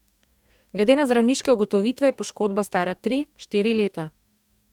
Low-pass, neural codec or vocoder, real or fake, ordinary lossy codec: 19.8 kHz; codec, 44.1 kHz, 2.6 kbps, DAC; fake; none